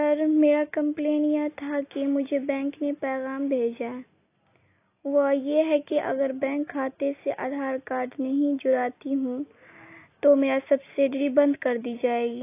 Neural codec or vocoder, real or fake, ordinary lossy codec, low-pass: none; real; AAC, 24 kbps; 3.6 kHz